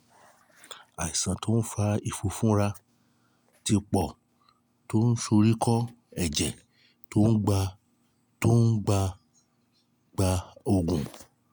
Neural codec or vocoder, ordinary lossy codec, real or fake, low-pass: none; none; real; none